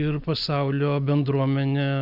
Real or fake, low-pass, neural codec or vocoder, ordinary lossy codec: real; 5.4 kHz; none; Opus, 64 kbps